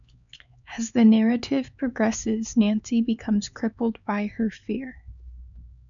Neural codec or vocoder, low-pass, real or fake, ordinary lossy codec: codec, 16 kHz, 4 kbps, X-Codec, HuBERT features, trained on LibriSpeech; 7.2 kHz; fake; MP3, 96 kbps